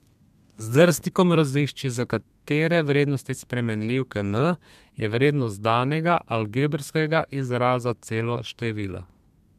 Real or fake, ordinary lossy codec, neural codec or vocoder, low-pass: fake; MP3, 96 kbps; codec, 32 kHz, 1.9 kbps, SNAC; 14.4 kHz